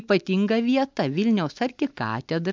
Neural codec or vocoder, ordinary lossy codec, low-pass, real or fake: none; MP3, 64 kbps; 7.2 kHz; real